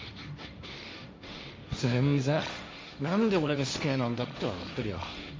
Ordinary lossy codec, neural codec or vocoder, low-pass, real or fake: none; codec, 16 kHz, 1.1 kbps, Voila-Tokenizer; none; fake